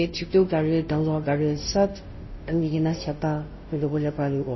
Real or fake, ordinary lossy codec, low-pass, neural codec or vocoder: fake; MP3, 24 kbps; 7.2 kHz; codec, 16 kHz, 0.5 kbps, FunCodec, trained on Chinese and English, 25 frames a second